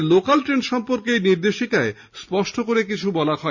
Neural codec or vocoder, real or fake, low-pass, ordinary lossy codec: none; real; 7.2 kHz; Opus, 64 kbps